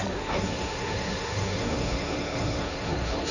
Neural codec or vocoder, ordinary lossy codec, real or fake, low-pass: codec, 16 kHz, 1.1 kbps, Voila-Tokenizer; none; fake; 7.2 kHz